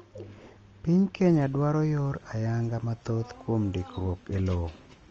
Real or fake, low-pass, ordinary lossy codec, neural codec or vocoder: real; 7.2 kHz; Opus, 24 kbps; none